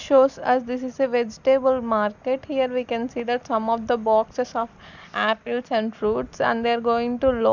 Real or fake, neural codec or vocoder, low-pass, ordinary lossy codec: real; none; 7.2 kHz; none